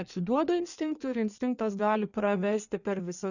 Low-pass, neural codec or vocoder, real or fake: 7.2 kHz; codec, 16 kHz in and 24 kHz out, 1.1 kbps, FireRedTTS-2 codec; fake